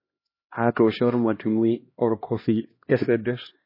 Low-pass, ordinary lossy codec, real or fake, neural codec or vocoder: 5.4 kHz; MP3, 24 kbps; fake; codec, 16 kHz, 1 kbps, X-Codec, HuBERT features, trained on LibriSpeech